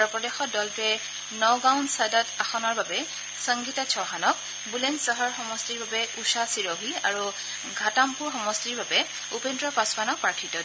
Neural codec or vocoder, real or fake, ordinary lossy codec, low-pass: none; real; none; none